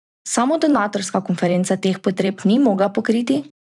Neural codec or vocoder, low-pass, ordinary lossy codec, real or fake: vocoder, 44.1 kHz, 128 mel bands every 512 samples, BigVGAN v2; 10.8 kHz; none; fake